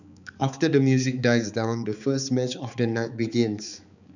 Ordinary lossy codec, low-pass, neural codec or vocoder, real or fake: none; 7.2 kHz; codec, 16 kHz, 4 kbps, X-Codec, HuBERT features, trained on balanced general audio; fake